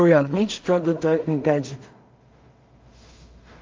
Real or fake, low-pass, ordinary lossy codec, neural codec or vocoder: fake; 7.2 kHz; Opus, 24 kbps; codec, 16 kHz in and 24 kHz out, 0.4 kbps, LongCat-Audio-Codec, two codebook decoder